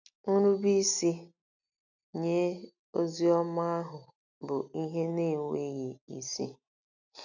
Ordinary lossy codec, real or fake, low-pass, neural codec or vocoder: none; real; 7.2 kHz; none